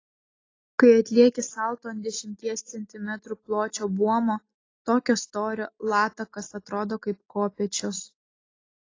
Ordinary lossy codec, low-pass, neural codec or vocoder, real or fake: AAC, 32 kbps; 7.2 kHz; none; real